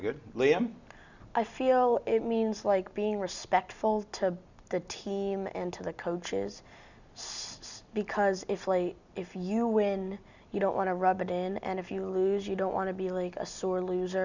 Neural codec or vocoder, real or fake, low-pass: none; real; 7.2 kHz